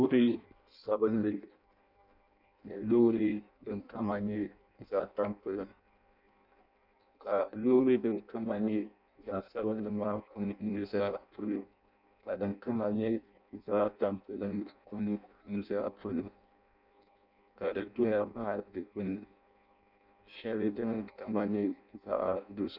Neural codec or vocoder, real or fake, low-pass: codec, 16 kHz in and 24 kHz out, 0.6 kbps, FireRedTTS-2 codec; fake; 5.4 kHz